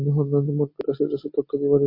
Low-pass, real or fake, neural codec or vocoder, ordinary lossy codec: 5.4 kHz; real; none; MP3, 32 kbps